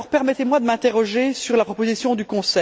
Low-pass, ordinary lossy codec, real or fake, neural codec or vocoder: none; none; real; none